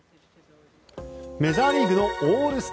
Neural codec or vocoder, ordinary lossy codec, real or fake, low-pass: none; none; real; none